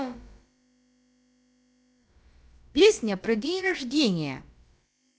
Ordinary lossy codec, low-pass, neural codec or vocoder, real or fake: none; none; codec, 16 kHz, about 1 kbps, DyCAST, with the encoder's durations; fake